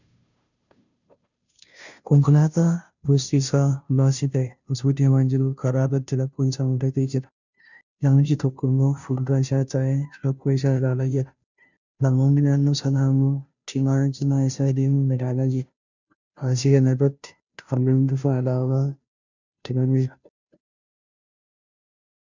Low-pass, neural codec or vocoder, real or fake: 7.2 kHz; codec, 16 kHz, 0.5 kbps, FunCodec, trained on Chinese and English, 25 frames a second; fake